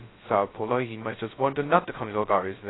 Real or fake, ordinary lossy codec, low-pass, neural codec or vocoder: fake; AAC, 16 kbps; 7.2 kHz; codec, 16 kHz, 0.2 kbps, FocalCodec